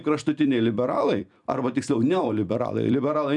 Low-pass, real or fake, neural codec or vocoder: 10.8 kHz; fake; vocoder, 44.1 kHz, 128 mel bands every 256 samples, BigVGAN v2